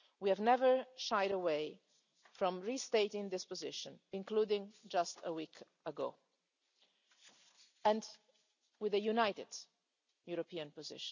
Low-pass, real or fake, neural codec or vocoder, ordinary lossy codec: 7.2 kHz; real; none; none